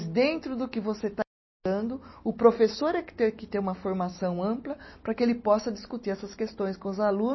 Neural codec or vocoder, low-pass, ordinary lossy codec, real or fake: none; 7.2 kHz; MP3, 24 kbps; real